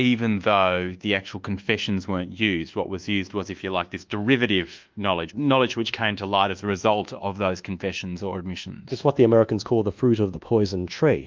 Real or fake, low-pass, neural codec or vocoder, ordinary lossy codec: fake; 7.2 kHz; codec, 24 kHz, 1.2 kbps, DualCodec; Opus, 24 kbps